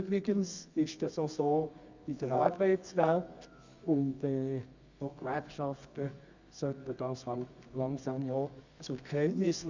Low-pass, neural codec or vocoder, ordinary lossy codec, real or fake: 7.2 kHz; codec, 24 kHz, 0.9 kbps, WavTokenizer, medium music audio release; Opus, 64 kbps; fake